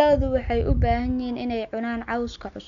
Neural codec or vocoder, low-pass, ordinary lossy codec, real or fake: none; 7.2 kHz; none; real